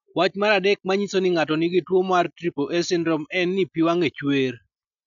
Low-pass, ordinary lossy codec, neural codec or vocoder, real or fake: 7.2 kHz; none; none; real